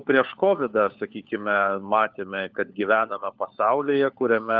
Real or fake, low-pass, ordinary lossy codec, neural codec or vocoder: fake; 7.2 kHz; Opus, 32 kbps; codec, 16 kHz, 4 kbps, FunCodec, trained on LibriTTS, 50 frames a second